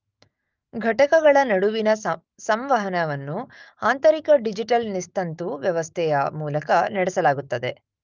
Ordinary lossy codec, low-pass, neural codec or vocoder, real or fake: Opus, 24 kbps; 7.2 kHz; autoencoder, 48 kHz, 128 numbers a frame, DAC-VAE, trained on Japanese speech; fake